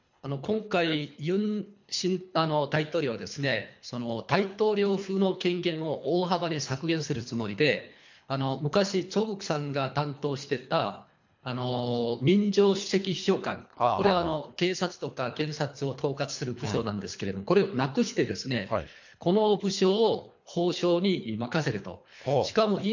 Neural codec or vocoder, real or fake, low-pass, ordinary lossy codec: codec, 24 kHz, 3 kbps, HILCodec; fake; 7.2 kHz; MP3, 48 kbps